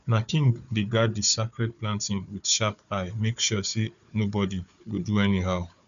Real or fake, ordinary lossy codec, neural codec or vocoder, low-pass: fake; none; codec, 16 kHz, 4 kbps, FunCodec, trained on Chinese and English, 50 frames a second; 7.2 kHz